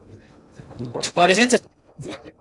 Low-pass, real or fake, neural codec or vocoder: 10.8 kHz; fake; codec, 16 kHz in and 24 kHz out, 0.6 kbps, FocalCodec, streaming, 2048 codes